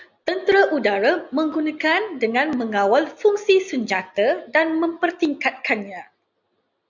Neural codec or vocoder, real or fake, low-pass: none; real; 7.2 kHz